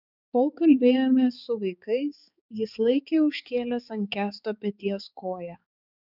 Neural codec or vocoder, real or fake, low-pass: codec, 16 kHz, 4 kbps, X-Codec, HuBERT features, trained on balanced general audio; fake; 5.4 kHz